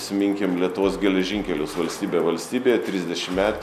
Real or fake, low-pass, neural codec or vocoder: real; 14.4 kHz; none